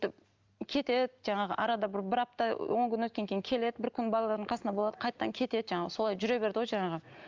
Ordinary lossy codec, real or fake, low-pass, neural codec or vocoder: Opus, 32 kbps; real; 7.2 kHz; none